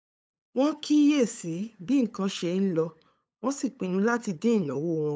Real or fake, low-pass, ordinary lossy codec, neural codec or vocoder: fake; none; none; codec, 16 kHz, 8 kbps, FunCodec, trained on LibriTTS, 25 frames a second